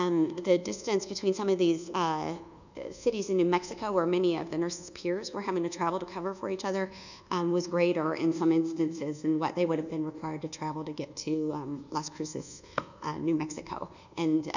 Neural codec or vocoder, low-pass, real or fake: codec, 24 kHz, 1.2 kbps, DualCodec; 7.2 kHz; fake